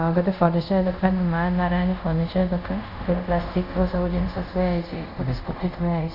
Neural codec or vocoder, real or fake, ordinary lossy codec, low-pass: codec, 24 kHz, 0.5 kbps, DualCodec; fake; none; 5.4 kHz